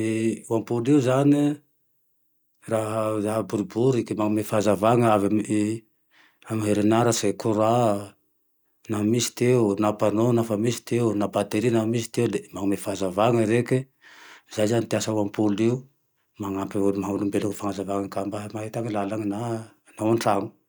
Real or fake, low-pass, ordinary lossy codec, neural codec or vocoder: real; none; none; none